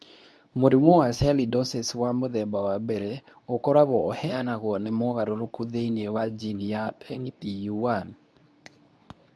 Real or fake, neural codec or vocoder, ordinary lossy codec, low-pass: fake; codec, 24 kHz, 0.9 kbps, WavTokenizer, medium speech release version 2; none; none